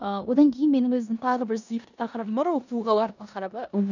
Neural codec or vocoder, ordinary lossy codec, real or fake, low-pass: codec, 16 kHz in and 24 kHz out, 0.9 kbps, LongCat-Audio-Codec, four codebook decoder; none; fake; 7.2 kHz